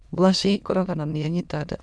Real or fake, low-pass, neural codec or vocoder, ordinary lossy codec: fake; none; autoencoder, 22.05 kHz, a latent of 192 numbers a frame, VITS, trained on many speakers; none